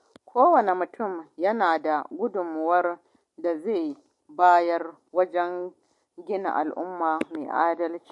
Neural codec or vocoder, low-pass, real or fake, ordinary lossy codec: none; 10.8 kHz; real; MP3, 48 kbps